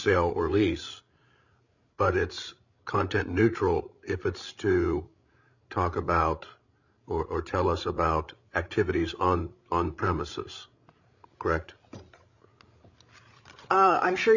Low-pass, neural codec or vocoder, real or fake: 7.2 kHz; codec, 16 kHz, 16 kbps, FreqCodec, larger model; fake